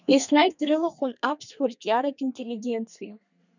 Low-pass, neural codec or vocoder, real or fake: 7.2 kHz; codec, 32 kHz, 1.9 kbps, SNAC; fake